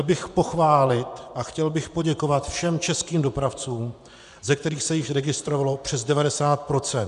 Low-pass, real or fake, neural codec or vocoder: 10.8 kHz; fake; vocoder, 24 kHz, 100 mel bands, Vocos